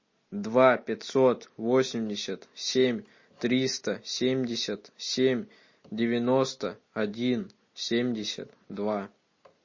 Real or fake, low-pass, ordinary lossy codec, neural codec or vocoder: real; 7.2 kHz; MP3, 32 kbps; none